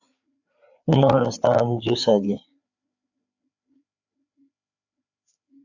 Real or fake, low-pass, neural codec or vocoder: fake; 7.2 kHz; codec, 16 kHz, 4 kbps, FreqCodec, larger model